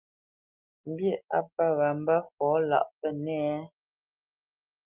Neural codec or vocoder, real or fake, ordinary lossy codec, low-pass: none; real; Opus, 24 kbps; 3.6 kHz